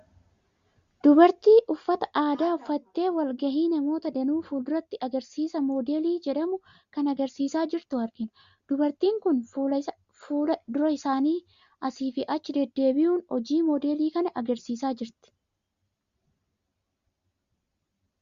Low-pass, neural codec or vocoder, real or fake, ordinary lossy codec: 7.2 kHz; none; real; Opus, 64 kbps